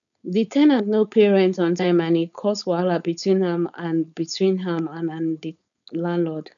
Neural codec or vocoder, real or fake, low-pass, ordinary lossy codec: codec, 16 kHz, 4.8 kbps, FACodec; fake; 7.2 kHz; none